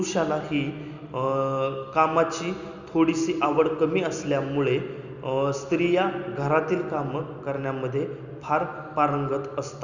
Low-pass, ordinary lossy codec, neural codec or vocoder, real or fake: 7.2 kHz; Opus, 64 kbps; none; real